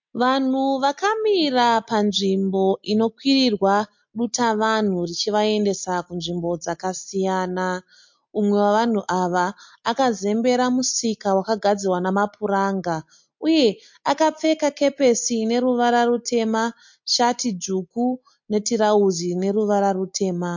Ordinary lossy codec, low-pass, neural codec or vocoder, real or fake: MP3, 48 kbps; 7.2 kHz; none; real